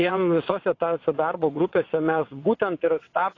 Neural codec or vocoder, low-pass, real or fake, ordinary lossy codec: vocoder, 22.05 kHz, 80 mel bands, WaveNeXt; 7.2 kHz; fake; AAC, 32 kbps